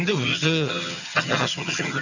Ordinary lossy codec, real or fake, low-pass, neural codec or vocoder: none; fake; 7.2 kHz; vocoder, 22.05 kHz, 80 mel bands, HiFi-GAN